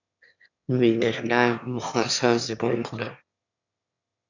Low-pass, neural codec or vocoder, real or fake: 7.2 kHz; autoencoder, 22.05 kHz, a latent of 192 numbers a frame, VITS, trained on one speaker; fake